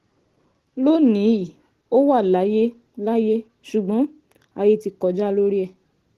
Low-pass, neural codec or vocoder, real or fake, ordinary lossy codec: 14.4 kHz; none; real; Opus, 16 kbps